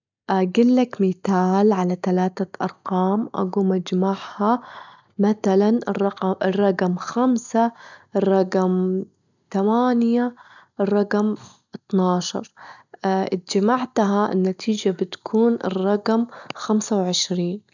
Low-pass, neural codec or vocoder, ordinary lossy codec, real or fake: 7.2 kHz; none; none; real